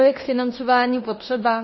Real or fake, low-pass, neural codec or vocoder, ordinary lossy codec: fake; 7.2 kHz; codec, 16 kHz in and 24 kHz out, 0.9 kbps, LongCat-Audio-Codec, fine tuned four codebook decoder; MP3, 24 kbps